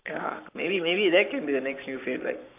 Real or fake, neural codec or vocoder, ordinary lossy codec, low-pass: fake; codec, 44.1 kHz, 7.8 kbps, Pupu-Codec; AAC, 24 kbps; 3.6 kHz